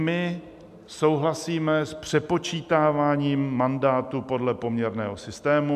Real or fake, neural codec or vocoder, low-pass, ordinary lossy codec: real; none; 14.4 kHz; MP3, 96 kbps